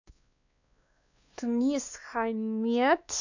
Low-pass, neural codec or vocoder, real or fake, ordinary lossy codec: 7.2 kHz; codec, 16 kHz, 2 kbps, X-Codec, WavLM features, trained on Multilingual LibriSpeech; fake; none